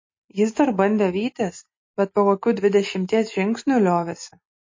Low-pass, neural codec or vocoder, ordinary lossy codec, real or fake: 7.2 kHz; none; MP3, 32 kbps; real